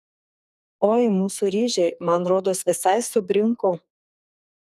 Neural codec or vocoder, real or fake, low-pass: codec, 44.1 kHz, 2.6 kbps, SNAC; fake; 14.4 kHz